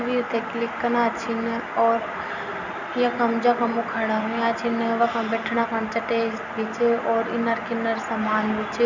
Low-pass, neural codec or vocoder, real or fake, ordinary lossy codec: 7.2 kHz; none; real; none